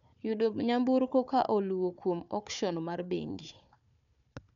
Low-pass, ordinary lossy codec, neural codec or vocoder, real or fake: 7.2 kHz; none; codec, 16 kHz, 4 kbps, FunCodec, trained on Chinese and English, 50 frames a second; fake